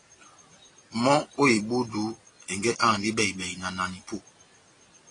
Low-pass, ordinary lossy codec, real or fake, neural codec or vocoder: 9.9 kHz; AAC, 32 kbps; real; none